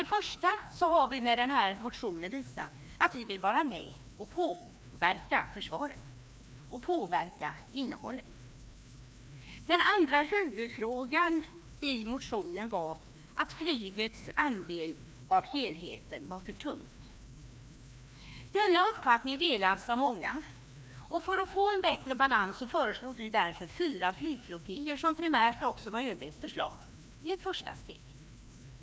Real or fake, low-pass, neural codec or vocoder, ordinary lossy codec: fake; none; codec, 16 kHz, 1 kbps, FreqCodec, larger model; none